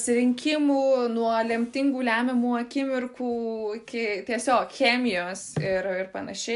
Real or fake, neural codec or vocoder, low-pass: real; none; 10.8 kHz